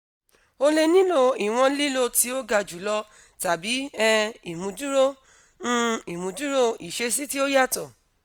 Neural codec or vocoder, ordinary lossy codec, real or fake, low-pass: none; none; real; none